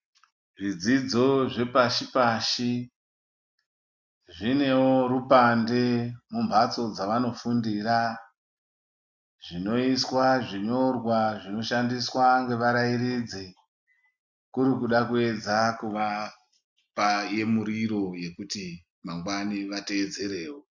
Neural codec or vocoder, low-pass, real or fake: none; 7.2 kHz; real